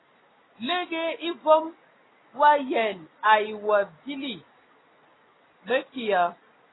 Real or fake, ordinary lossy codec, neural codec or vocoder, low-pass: real; AAC, 16 kbps; none; 7.2 kHz